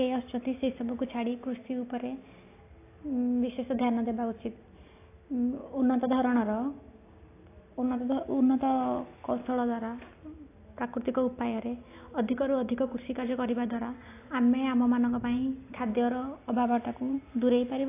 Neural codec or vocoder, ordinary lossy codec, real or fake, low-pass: none; AAC, 24 kbps; real; 3.6 kHz